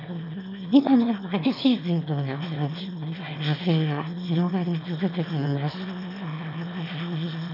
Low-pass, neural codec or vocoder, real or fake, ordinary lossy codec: 5.4 kHz; autoencoder, 22.05 kHz, a latent of 192 numbers a frame, VITS, trained on one speaker; fake; none